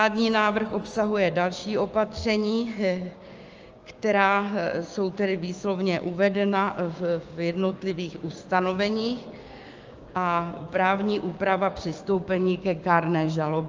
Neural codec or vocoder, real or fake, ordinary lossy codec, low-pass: codec, 16 kHz, 6 kbps, DAC; fake; Opus, 24 kbps; 7.2 kHz